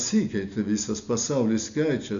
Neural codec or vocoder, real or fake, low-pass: none; real; 7.2 kHz